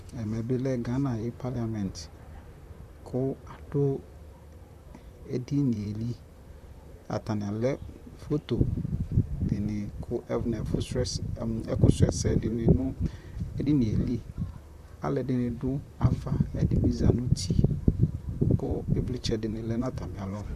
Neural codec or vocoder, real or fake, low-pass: vocoder, 44.1 kHz, 128 mel bands, Pupu-Vocoder; fake; 14.4 kHz